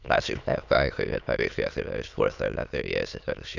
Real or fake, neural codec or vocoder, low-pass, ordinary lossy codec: fake; autoencoder, 22.05 kHz, a latent of 192 numbers a frame, VITS, trained on many speakers; 7.2 kHz; none